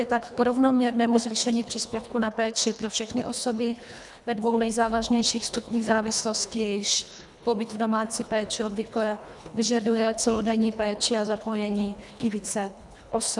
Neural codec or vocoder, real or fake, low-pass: codec, 24 kHz, 1.5 kbps, HILCodec; fake; 10.8 kHz